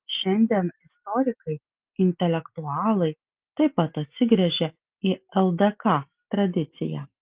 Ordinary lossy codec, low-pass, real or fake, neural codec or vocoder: Opus, 32 kbps; 3.6 kHz; real; none